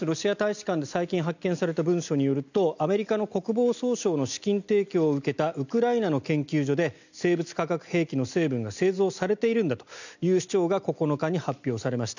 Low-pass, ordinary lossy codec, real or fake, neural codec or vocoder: 7.2 kHz; none; real; none